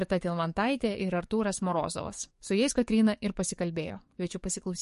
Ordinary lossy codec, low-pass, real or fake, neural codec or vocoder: MP3, 48 kbps; 14.4 kHz; fake; codec, 44.1 kHz, 7.8 kbps, DAC